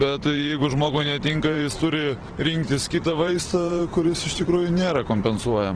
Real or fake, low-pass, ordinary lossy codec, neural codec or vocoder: fake; 9.9 kHz; Opus, 16 kbps; vocoder, 44.1 kHz, 128 mel bands every 512 samples, BigVGAN v2